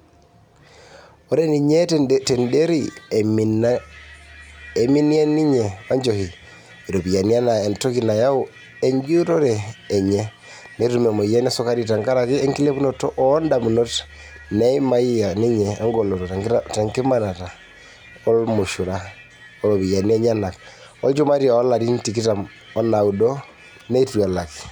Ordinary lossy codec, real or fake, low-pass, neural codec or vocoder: none; real; 19.8 kHz; none